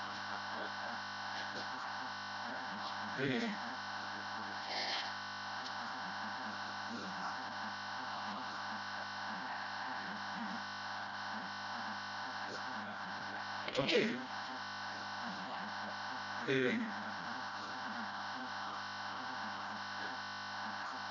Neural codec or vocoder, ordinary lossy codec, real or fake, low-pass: codec, 16 kHz, 0.5 kbps, FreqCodec, smaller model; none; fake; 7.2 kHz